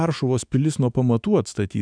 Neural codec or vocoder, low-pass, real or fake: autoencoder, 48 kHz, 128 numbers a frame, DAC-VAE, trained on Japanese speech; 9.9 kHz; fake